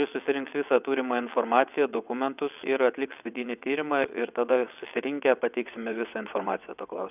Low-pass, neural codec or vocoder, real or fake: 3.6 kHz; vocoder, 22.05 kHz, 80 mel bands, WaveNeXt; fake